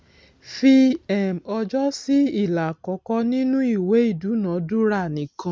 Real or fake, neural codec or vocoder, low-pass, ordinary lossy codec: real; none; none; none